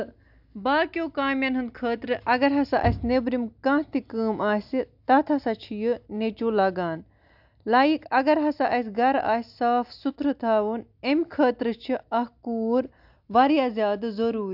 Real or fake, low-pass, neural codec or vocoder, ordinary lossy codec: real; 5.4 kHz; none; AAC, 48 kbps